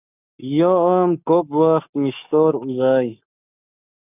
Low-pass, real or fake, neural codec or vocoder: 3.6 kHz; fake; codec, 44.1 kHz, 7.8 kbps, Pupu-Codec